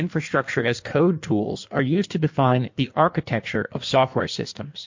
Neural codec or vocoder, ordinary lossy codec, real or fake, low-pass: codec, 16 kHz in and 24 kHz out, 1.1 kbps, FireRedTTS-2 codec; MP3, 48 kbps; fake; 7.2 kHz